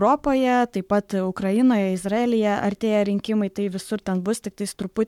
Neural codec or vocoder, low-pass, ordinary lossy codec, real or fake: codec, 44.1 kHz, 7.8 kbps, Pupu-Codec; 19.8 kHz; MP3, 96 kbps; fake